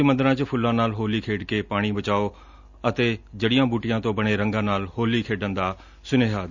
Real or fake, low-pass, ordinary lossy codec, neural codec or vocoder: real; 7.2 kHz; none; none